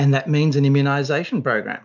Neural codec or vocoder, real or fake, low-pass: none; real; 7.2 kHz